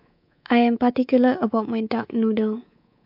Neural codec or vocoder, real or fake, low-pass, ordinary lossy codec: codec, 24 kHz, 3.1 kbps, DualCodec; fake; 5.4 kHz; MP3, 48 kbps